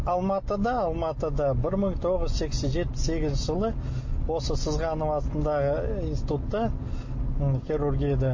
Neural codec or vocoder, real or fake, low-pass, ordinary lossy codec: none; real; 7.2 kHz; MP3, 32 kbps